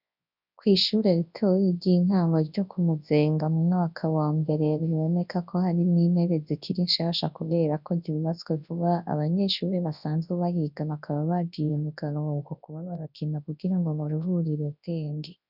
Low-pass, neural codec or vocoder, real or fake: 5.4 kHz; codec, 24 kHz, 0.9 kbps, WavTokenizer, large speech release; fake